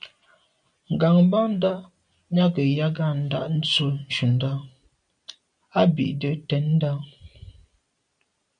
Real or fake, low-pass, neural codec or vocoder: real; 9.9 kHz; none